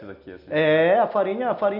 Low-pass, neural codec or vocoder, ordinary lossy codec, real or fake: 5.4 kHz; none; none; real